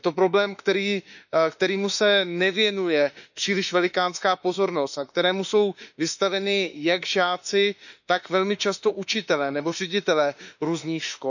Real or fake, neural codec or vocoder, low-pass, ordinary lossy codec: fake; autoencoder, 48 kHz, 32 numbers a frame, DAC-VAE, trained on Japanese speech; 7.2 kHz; none